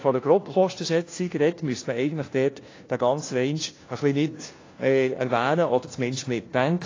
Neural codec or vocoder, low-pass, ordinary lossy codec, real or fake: codec, 16 kHz, 1 kbps, FunCodec, trained on LibriTTS, 50 frames a second; 7.2 kHz; AAC, 32 kbps; fake